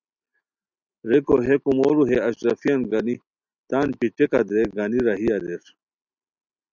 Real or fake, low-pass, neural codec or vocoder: real; 7.2 kHz; none